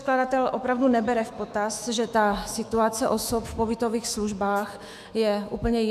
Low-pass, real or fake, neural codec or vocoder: 14.4 kHz; fake; autoencoder, 48 kHz, 128 numbers a frame, DAC-VAE, trained on Japanese speech